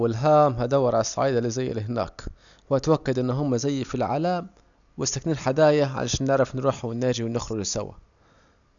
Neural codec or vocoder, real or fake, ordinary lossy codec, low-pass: none; real; none; 7.2 kHz